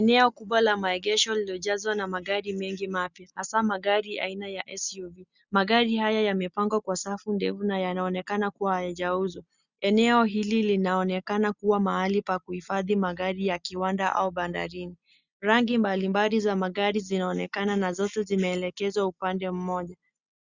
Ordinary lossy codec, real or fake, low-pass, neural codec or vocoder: Opus, 64 kbps; real; 7.2 kHz; none